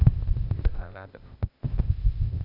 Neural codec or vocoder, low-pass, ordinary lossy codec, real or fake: codec, 16 kHz, 0.8 kbps, ZipCodec; 5.4 kHz; none; fake